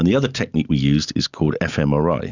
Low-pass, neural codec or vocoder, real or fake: 7.2 kHz; none; real